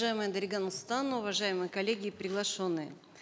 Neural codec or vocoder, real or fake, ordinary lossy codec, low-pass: none; real; none; none